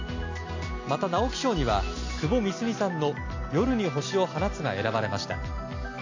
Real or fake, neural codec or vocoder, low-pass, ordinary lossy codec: real; none; 7.2 kHz; AAC, 48 kbps